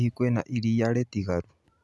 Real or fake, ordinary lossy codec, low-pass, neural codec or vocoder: real; none; none; none